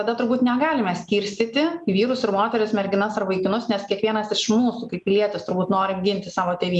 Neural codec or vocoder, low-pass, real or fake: none; 10.8 kHz; real